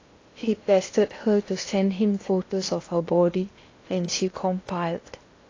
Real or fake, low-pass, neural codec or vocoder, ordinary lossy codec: fake; 7.2 kHz; codec, 16 kHz in and 24 kHz out, 0.8 kbps, FocalCodec, streaming, 65536 codes; AAC, 32 kbps